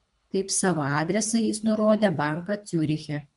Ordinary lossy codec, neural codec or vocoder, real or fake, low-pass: MP3, 64 kbps; codec, 24 kHz, 3 kbps, HILCodec; fake; 10.8 kHz